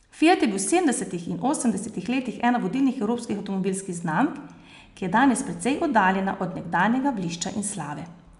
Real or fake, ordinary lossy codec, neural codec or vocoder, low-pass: real; none; none; 10.8 kHz